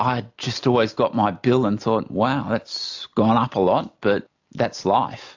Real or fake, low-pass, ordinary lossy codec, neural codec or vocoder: real; 7.2 kHz; AAC, 48 kbps; none